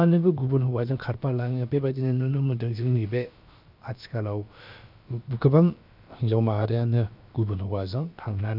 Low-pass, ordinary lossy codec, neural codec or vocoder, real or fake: 5.4 kHz; none; codec, 16 kHz, about 1 kbps, DyCAST, with the encoder's durations; fake